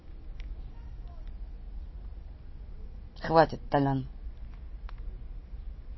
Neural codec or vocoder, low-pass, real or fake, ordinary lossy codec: none; 7.2 kHz; real; MP3, 24 kbps